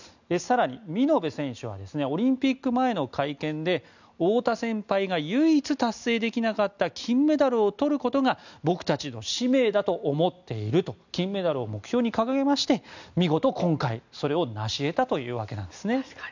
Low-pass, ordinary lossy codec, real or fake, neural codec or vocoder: 7.2 kHz; none; real; none